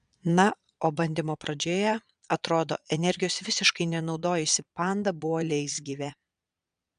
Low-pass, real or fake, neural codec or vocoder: 9.9 kHz; fake; vocoder, 22.05 kHz, 80 mel bands, Vocos